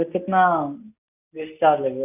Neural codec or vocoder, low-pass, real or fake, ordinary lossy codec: none; 3.6 kHz; real; none